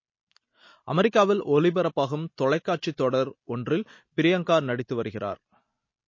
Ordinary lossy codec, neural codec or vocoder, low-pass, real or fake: MP3, 32 kbps; none; 7.2 kHz; real